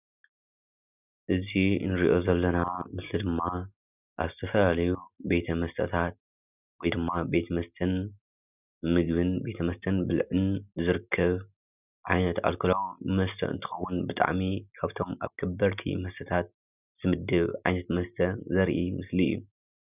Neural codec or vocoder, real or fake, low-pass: none; real; 3.6 kHz